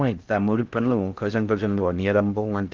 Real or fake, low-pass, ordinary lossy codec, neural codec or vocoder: fake; 7.2 kHz; Opus, 32 kbps; codec, 16 kHz in and 24 kHz out, 0.6 kbps, FocalCodec, streaming, 4096 codes